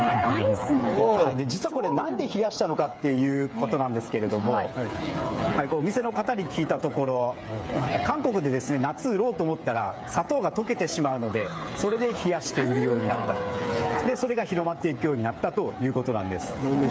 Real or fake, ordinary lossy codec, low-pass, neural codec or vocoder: fake; none; none; codec, 16 kHz, 8 kbps, FreqCodec, smaller model